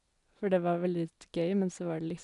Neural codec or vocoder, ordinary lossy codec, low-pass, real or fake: none; none; 10.8 kHz; real